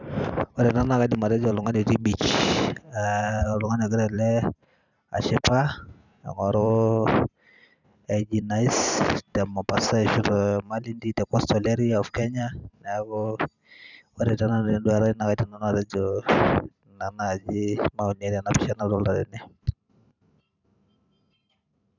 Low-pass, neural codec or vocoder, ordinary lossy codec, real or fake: 7.2 kHz; vocoder, 44.1 kHz, 128 mel bands every 512 samples, BigVGAN v2; none; fake